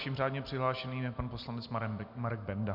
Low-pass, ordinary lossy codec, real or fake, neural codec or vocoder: 5.4 kHz; MP3, 48 kbps; real; none